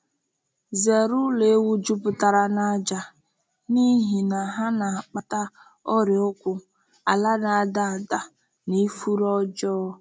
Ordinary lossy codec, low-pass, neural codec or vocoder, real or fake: none; none; none; real